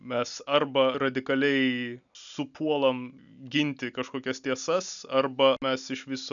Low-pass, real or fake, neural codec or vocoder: 7.2 kHz; real; none